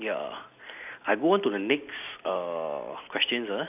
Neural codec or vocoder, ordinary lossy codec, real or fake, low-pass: none; none; real; 3.6 kHz